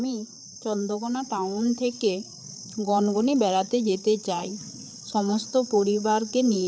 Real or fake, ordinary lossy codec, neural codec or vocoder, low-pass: fake; none; codec, 16 kHz, 8 kbps, FreqCodec, larger model; none